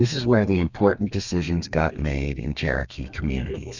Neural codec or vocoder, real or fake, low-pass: codec, 32 kHz, 1.9 kbps, SNAC; fake; 7.2 kHz